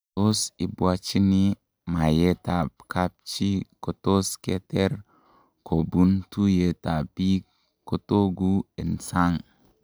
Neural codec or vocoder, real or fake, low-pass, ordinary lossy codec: none; real; none; none